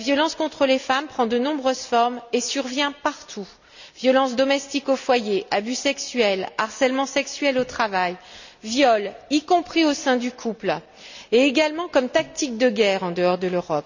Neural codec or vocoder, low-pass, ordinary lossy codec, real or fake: none; 7.2 kHz; none; real